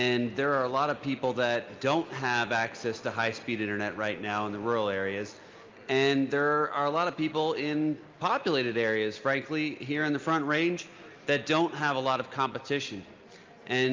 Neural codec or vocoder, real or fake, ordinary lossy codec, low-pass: none; real; Opus, 24 kbps; 7.2 kHz